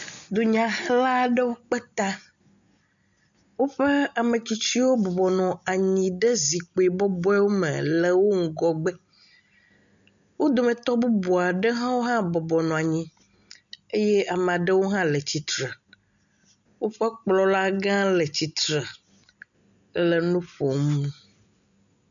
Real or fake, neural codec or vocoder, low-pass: real; none; 7.2 kHz